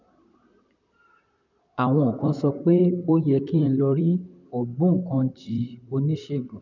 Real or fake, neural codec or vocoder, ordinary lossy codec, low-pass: fake; vocoder, 44.1 kHz, 128 mel bands, Pupu-Vocoder; none; 7.2 kHz